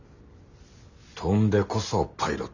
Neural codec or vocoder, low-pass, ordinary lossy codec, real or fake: none; 7.2 kHz; none; real